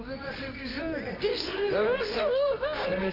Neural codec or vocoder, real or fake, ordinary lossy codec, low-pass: codec, 24 kHz, 0.9 kbps, WavTokenizer, medium music audio release; fake; none; 5.4 kHz